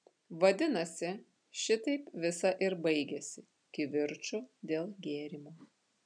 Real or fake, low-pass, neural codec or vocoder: real; 9.9 kHz; none